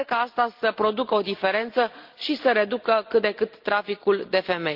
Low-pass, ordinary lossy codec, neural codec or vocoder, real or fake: 5.4 kHz; Opus, 32 kbps; none; real